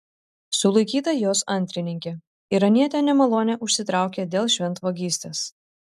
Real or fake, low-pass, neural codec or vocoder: real; 14.4 kHz; none